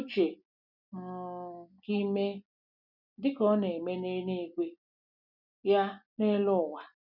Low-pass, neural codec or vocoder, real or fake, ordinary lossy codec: 5.4 kHz; none; real; none